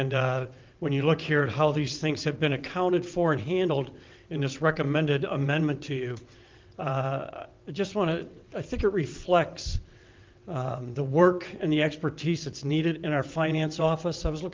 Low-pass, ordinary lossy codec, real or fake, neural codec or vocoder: 7.2 kHz; Opus, 24 kbps; fake; vocoder, 22.05 kHz, 80 mel bands, WaveNeXt